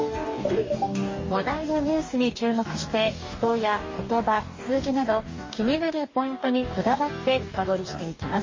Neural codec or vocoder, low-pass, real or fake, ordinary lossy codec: codec, 44.1 kHz, 2.6 kbps, DAC; 7.2 kHz; fake; MP3, 32 kbps